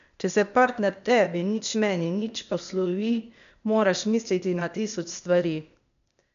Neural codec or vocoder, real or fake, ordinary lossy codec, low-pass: codec, 16 kHz, 0.8 kbps, ZipCodec; fake; none; 7.2 kHz